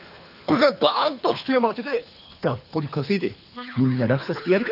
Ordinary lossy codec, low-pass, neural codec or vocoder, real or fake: none; 5.4 kHz; codec, 24 kHz, 3 kbps, HILCodec; fake